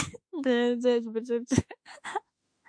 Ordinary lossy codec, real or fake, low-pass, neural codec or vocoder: MP3, 64 kbps; fake; 9.9 kHz; autoencoder, 48 kHz, 32 numbers a frame, DAC-VAE, trained on Japanese speech